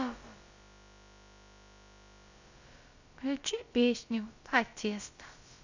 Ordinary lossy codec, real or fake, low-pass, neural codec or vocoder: Opus, 64 kbps; fake; 7.2 kHz; codec, 16 kHz, about 1 kbps, DyCAST, with the encoder's durations